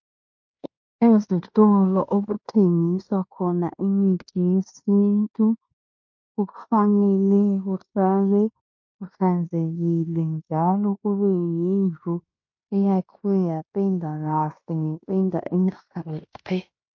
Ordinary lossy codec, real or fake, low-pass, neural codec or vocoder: MP3, 48 kbps; fake; 7.2 kHz; codec, 16 kHz in and 24 kHz out, 0.9 kbps, LongCat-Audio-Codec, fine tuned four codebook decoder